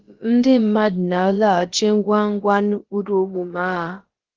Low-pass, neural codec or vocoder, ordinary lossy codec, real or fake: 7.2 kHz; codec, 16 kHz, about 1 kbps, DyCAST, with the encoder's durations; Opus, 16 kbps; fake